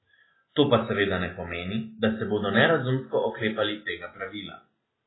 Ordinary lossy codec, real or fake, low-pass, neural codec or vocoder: AAC, 16 kbps; real; 7.2 kHz; none